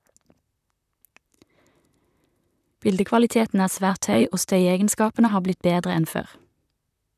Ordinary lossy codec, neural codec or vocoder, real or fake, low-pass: none; vocoder, 44.1 kHz, 128 mel bands, Pupu-Vocoder; fake; 14.4 kHz